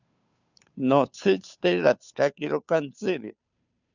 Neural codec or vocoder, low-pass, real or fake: codec, 16 kHz, 2 kbps, FunCodec, trained on Chinese and English, 25 frames a second; 7.2 kHz; fake